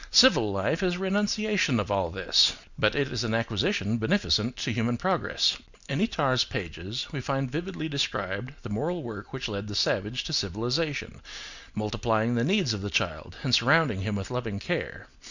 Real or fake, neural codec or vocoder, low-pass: real; none; 7.2 kHz